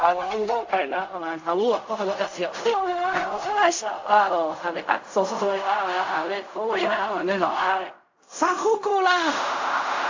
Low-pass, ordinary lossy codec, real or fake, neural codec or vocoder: 7.2 kHz; AAC, 48 kbps; fake; codec, 16 kHz in and 24 kHz out, 0.4 kbps, LongCat-Audio-Codec, fine tuned four codebook decoder